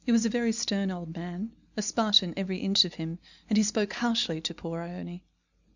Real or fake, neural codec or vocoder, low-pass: real; none; 7.2 kHz